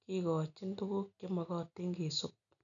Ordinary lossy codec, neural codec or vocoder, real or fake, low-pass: none; none; real; 7.2 kHz